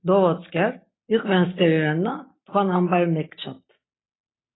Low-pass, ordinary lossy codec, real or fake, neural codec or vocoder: 7.2 kHz; AAC, 16 kbps; real; none